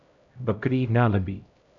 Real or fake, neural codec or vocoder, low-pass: fake; codec, 16 kHz, 0.5 kbps, X-Codec, HuBERT features, trained on LibriSpeech; 7.2 kHz